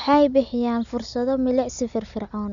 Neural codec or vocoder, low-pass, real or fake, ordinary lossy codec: none; 7.2 kHz; real; none